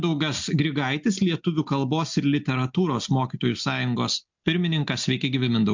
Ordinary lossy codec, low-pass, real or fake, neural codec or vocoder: MP3, 64 kbps; 7.2 kHz; real; none